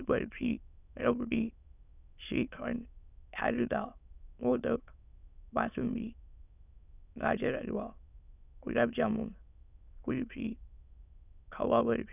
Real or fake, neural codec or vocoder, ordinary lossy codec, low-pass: fake; autoencoder, 22.05 kHz, a latent of 192 numbers a frame, VITS, trained on many speakers; none; 3.6 kHz